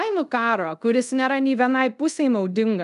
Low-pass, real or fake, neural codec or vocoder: 10.8 kHz; fake; codec, 24 kHz, 0.5 kbps, DualCodec